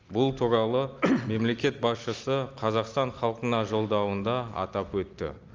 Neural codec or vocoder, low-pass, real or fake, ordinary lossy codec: none; 7.2 kHz; real; Opus, 24 kbps